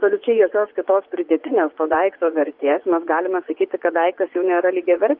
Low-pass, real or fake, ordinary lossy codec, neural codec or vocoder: 5.4 kHz; fake; Opus, 24 kbps; vocoder, 24 kHz, 100 mel bands, Vocos